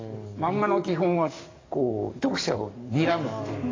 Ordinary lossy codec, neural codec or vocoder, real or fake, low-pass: AAC, 32 kbps; codec, 44.1 kHz, 7.8 kbps, DAC; fake; 7.2 kHz